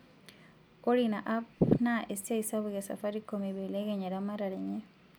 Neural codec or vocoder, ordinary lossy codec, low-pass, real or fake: none; none; none; real